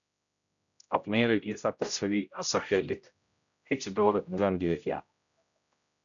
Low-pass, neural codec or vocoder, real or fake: 7.2 kHz; codec, 16 kHz, 0.5 kbps, X-Codec, HuBERT features, trained on general audio; fake